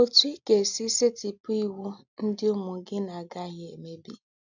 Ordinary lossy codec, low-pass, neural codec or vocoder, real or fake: none; 7.2 kHz; none; real